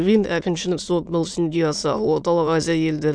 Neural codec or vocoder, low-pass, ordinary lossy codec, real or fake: autoencoder, 22.05 kHz, a latent of 192 numbers a frame, VITS, trained on many speakers; 9.9 kHz; none; fake